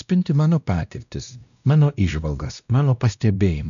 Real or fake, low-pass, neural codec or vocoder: fake; 7.2 kHz; codec, 16 kHz, 1 kbps, X-Codec, WavLM features, trained on Multilingual LibriSpeech